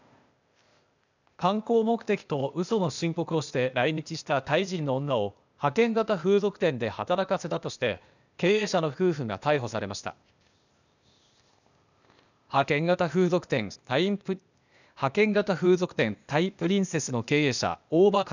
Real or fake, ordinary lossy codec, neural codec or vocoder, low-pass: fake; none; codec, 16 kHz, 0.8 kbps, ZipCodec; 7.2 kHz